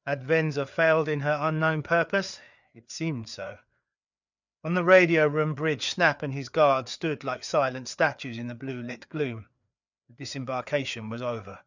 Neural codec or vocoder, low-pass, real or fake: codec, 16 kHz, 4 kbps, FreqCodec, larger model; 7.2 kHz; fake